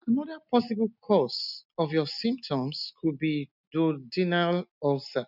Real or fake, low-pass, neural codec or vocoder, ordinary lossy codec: real; 5.4 kHz; none; none